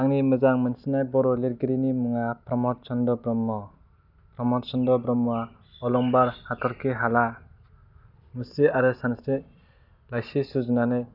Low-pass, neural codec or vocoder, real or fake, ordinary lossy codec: 5.4 kHz; none; real; none